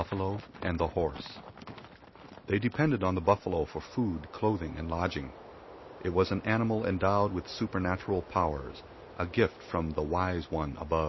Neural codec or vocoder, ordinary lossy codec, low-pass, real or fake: none; MP3, 24 kbps; 7.2 kHz; real